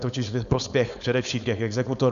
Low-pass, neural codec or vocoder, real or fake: 7.2 kHz; codec, 16 kHz, 4.8 kbps, FACodec; fake